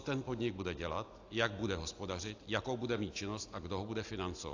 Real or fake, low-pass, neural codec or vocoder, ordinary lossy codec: real; 7.2 kHz; none; AAC, 48 kbps